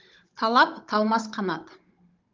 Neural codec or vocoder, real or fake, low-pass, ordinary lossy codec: codec, 16 kHz, 16 kbps, FunCodec, trained on Chinese and English, 50 frames a second; fake; 7.2 kHz; Opus, 32 kbps